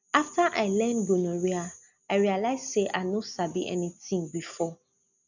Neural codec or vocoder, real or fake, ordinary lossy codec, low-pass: none; real; none; 7.2 kHz